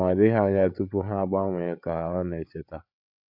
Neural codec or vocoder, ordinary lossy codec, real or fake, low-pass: codec, 16 kHz, 8 kbps, FreqCodec, larger model; MP3, 48 kbps; fake; 5.4 kHz